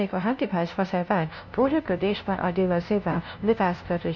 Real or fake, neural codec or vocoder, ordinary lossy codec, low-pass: fake; codec, 16 kHz, 0.5 kbps, FunCodec, trained on LibriTTS, 25 frames a second; MP3, 48 kbps; 7.2 kHz